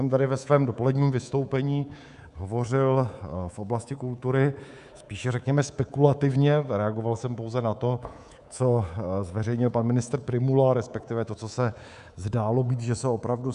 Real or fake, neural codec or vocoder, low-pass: fake; codec, 24 kHz, 3.1 kbps, DualCodec; 10.8 kHz